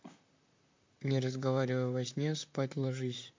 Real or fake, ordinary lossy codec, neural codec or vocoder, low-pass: fake; MP3, 48 kbps; vocoder, 44.1 kHz, 128 mel bands, Pupu-Vocoder; 7.2 kHz